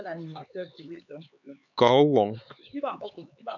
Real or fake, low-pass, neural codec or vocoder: fake; 7.2 kHz; codec, 16 kHz, 4 kbps, X-Codec, HuBERT features, trained on LibriSpeech